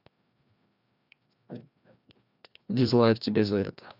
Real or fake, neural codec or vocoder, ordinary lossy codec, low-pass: fake; codec, 16 kHz, 1 kbps, FreqCodec, larger model; none; 5.4 kHz